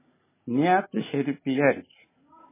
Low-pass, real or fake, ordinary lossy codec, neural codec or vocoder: 3.6 kHz; real; MP3, 16 kbps; none